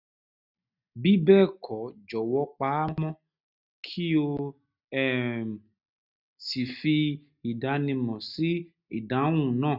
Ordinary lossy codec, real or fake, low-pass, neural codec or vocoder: none; real; 5.4 kHz; none